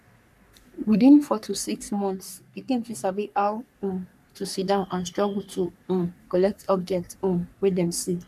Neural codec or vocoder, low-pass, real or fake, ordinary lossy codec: codec, 44.1 kHz, 3.4 kbps, Pupu-Codec; 14.4 kHz; fake; none